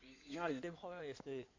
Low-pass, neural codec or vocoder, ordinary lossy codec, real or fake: 7.2 kHz; codec, 16 kHz in and 24 kHz out, 2.2 kbps, FireRedTTS-2 codec; AAC, 48 kbps; fake